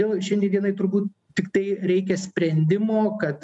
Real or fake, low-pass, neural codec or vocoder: real; 10.8 kHz; none